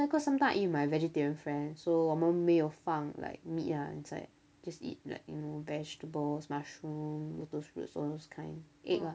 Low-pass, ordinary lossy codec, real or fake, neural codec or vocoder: none; none; real; none